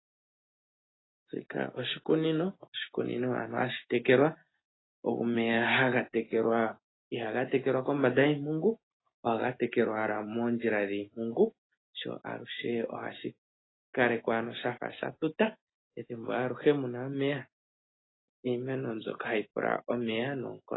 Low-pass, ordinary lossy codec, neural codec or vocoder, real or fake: 7.2 kHz; AAC, 16 kbps; none; real